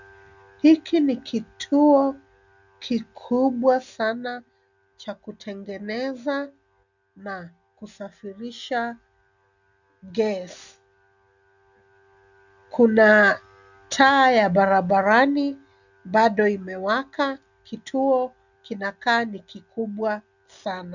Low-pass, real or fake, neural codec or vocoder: 7.2 kHz; real; none